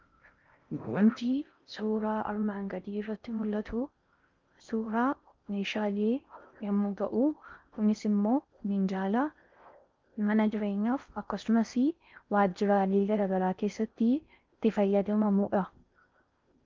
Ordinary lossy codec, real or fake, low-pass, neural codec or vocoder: Opus, 24 kbps; fake; 7.2 kHz; codec, 16 kHz in and 24 kHz out, 0.6 kbps, FocalCodec, streaming, 4096 codes